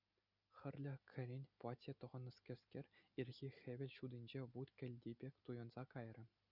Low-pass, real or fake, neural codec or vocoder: 5.4 kHz; real; none